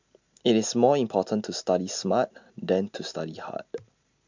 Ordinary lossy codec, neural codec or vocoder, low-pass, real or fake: MP3, 64 kbps; none; 7.2 kHz; real